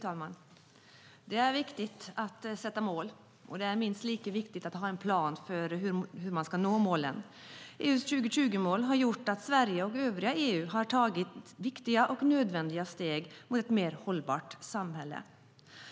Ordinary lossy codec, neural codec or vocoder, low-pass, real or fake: none; none; none; real